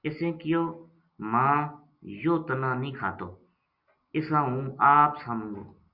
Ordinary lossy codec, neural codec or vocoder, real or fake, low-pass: Opus, 64 kbps; none; real; 5.4 kHz